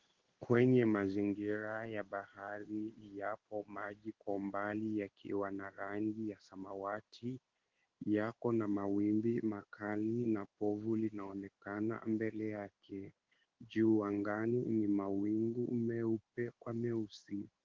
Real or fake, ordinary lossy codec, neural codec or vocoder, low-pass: fake; Opus, 16 kbps; codec, 16 kHz in and 24 kHz out, 1 kbps, XY-Tokenizer; 7.2 kHz